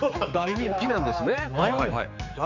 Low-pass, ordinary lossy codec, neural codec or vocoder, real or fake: 7.2 kHz; none; codec, 16 kHz, 4 kbps, X-Codec, HuBERT features, trained on general audio; fake